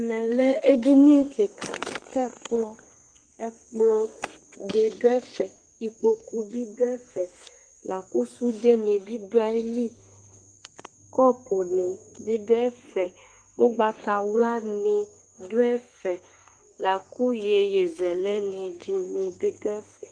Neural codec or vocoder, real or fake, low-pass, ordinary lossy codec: codec, 32 kHz, 1.9 kbps, SNAC; fake; 9.9 kHz; Opus, 24 kbps